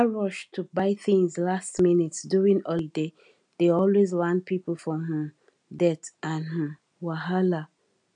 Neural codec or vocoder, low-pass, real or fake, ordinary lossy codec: none; 9.9 kHz; real; none